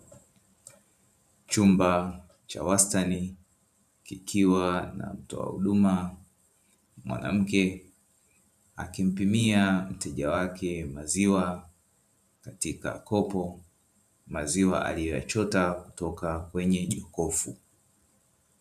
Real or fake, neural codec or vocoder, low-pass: fake; vocoder, 44.1 kHz, 128 mel bands every 512 samples, BigVGAN v2; 14.4 kHz